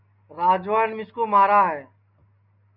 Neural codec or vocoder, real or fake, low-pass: none; real; 5.4 kHz